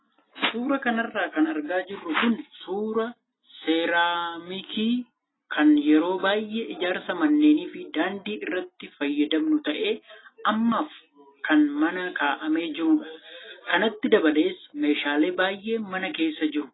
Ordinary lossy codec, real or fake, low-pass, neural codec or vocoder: AAC, 16 kbps; real; 7.2 kHz; none